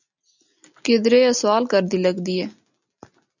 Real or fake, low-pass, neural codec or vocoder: real; 7.2 kHz; none